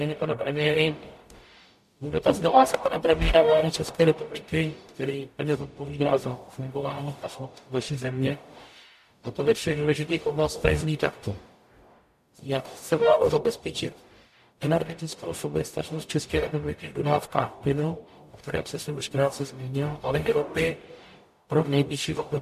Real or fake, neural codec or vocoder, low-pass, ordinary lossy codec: fake; codec, 44.1 kHz, 0.9 kbps, DAC; 14.4 kHz; MP3, 64 kbps